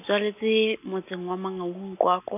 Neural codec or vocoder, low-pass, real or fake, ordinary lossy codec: none; 3.6 kHz; real; none